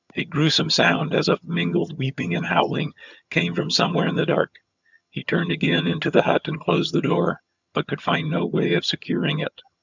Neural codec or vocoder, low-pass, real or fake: vocoder, 22.05 kHz, 80 mel bands, HiFi-GAN; 7.2 kHz; fake